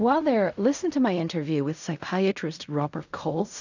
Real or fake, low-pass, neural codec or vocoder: fake; 7.2 kHz; codec, 16 kHz in and 24 kHz out, 0.4 kbps, LongCat-Audio-Codec, fine tuned four codebook decoder